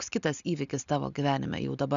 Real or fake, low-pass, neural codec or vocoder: real; 7.2 kHz; none